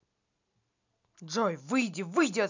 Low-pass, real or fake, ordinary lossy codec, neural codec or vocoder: 7.2 kHz; real; none; none